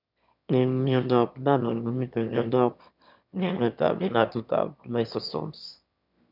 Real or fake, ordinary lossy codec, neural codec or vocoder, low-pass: fake; none; autoencoder, 22.05 kHz, a latent of 192 numbers a frame, VITS, trained on one speaker; 5.4 kHz